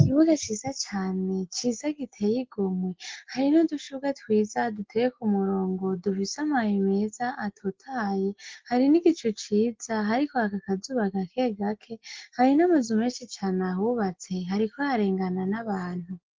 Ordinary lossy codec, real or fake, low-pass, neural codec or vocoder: Opus, 16 kbps; real; 7.2 kHz; none